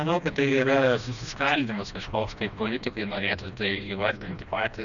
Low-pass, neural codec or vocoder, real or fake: 7.2 kHz; codec, 16 kHz, 1 kbps, FreqCodec, smaller model; fake